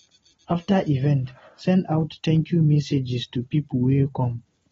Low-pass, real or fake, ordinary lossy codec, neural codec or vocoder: 7.2 kHz; real; AAC, 24 kbps; none